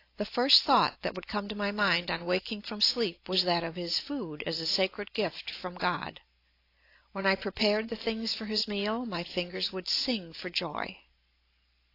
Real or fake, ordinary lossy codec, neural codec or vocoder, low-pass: real; AAC, 32 kbps; none; 5.4 kHz